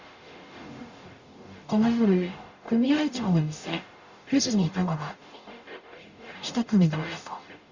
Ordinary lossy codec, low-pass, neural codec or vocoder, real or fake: Opus, 64 kbps; 7.2 kHz; codec, 44.1 kHz, 0.9 kbps, DAC; fake